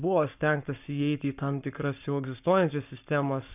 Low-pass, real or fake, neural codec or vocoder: 3.6 kHz; fake; codec, 44.1 kHz, 7.8 kbps, Pupu-Codec